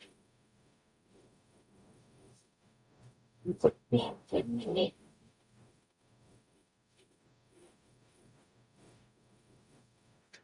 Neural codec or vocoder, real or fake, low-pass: codec, 44.1 kHz, 0.9 kbps, DAC; fake; 10.8 kHz